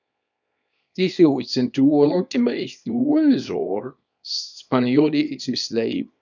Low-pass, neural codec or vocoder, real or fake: 7.2 kHz; codec, 24 kHz, 0.9 kbps, WavTokenizer, small release; fake